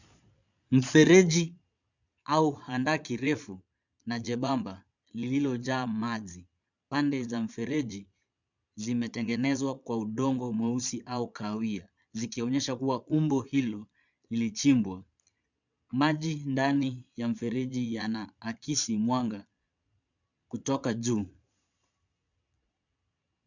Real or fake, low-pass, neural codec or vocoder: fake; 7.2 kHz; vocoder, 22.05 kHz, 80 mel bands, Vocos